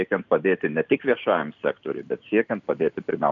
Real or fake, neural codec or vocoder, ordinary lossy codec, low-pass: real; none; MP3, 96 kbps; 7.2 kHz